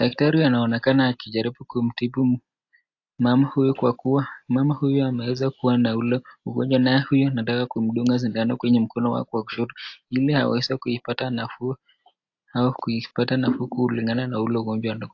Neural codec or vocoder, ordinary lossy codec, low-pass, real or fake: none; AAC, 48 kbps; 7.2 kHz; real